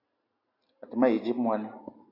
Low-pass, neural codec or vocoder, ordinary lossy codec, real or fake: 5.4 kHz; none; AAC, 32 kbps; real